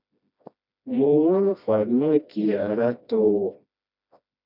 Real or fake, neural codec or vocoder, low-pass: fake; codec, 16 kHz, 1 kbps, FreqCodec, smaller model; 5.4 kHz